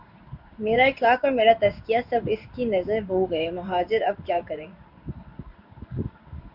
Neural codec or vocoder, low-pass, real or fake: codec, 16 kHz in and 24 kHz out, 1 kbps, XY-Tokenizer; 5.4 kHz; fake